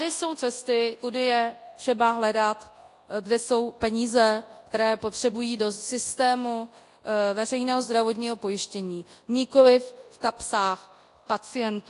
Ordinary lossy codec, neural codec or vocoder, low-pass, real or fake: AAC, 48 kbps; codec, 24 kHz, 0.9 kbps, WavTokenizer, large speech release; 10.8 kHz; fake